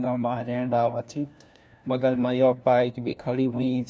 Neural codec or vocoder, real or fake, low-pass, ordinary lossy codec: codec, 16 kHz, 1 kbps, FunCodec, trained on LibriTTS, 50 frames a second; fake; none; none